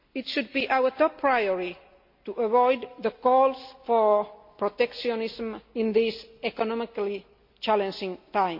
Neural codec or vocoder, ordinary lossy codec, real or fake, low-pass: none; MP3, 32 kbps; real; 5.4 kHz